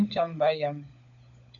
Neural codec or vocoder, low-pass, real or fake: codec, 16 kHz, 16 kbps, FunCodec, trained on LibriTTS, 50 frames a second; 7.2 kHz; fake